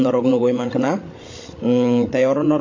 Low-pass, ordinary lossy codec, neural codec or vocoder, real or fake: 7.2 kHz; AAC, 32 kbps; codec, 16 kHz, 16 kbps, FreqCodec, larger model; fake